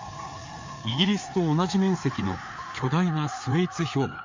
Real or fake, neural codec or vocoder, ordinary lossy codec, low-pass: fake; codec, 16 kHz, 4 kbps, FreqCodec, larger model; MP3, 64 kbps; 7.2 kHz